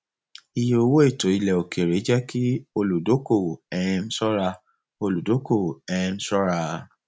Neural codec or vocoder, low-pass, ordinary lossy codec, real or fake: none; none; none; real